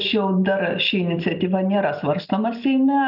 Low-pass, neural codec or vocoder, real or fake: 5.4 kHz; none; real